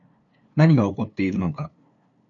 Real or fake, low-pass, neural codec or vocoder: fake; 7.2 kHz; codec, 16 kHz, 2 kbps, FunCodec, trained on LibriTTS, 25 frames a second